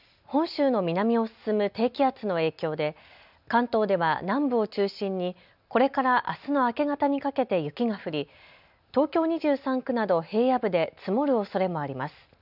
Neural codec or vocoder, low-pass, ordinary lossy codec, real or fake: none; 5.4 kHz; none; real